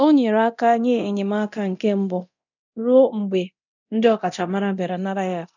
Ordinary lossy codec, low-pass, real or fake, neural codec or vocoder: none; 7.2 kHz; fake; codec, 24 kHz, 0.9 kbps, DualCodec